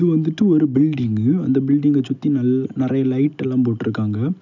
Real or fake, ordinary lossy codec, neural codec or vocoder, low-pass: real; none; none; 7.2 kHz